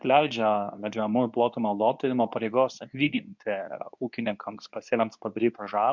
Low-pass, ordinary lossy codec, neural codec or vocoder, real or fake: 7.2 kHz; MP3, 64 kbps; codec, 24 kHz, 0.9 kbps, WavTokenizer, medium speech release version 2; fake